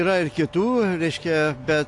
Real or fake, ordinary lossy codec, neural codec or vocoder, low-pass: real; AAC, 48 kbps; none; 10.8 kHz